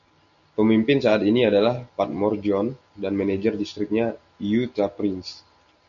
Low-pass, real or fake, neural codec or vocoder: 7.2 kHz; real; none